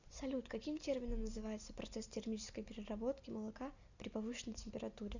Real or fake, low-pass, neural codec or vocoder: real; 7.2 kHz; none